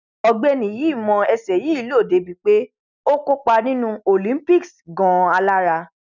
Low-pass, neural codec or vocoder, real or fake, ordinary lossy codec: 7.2 kHz; none; real; none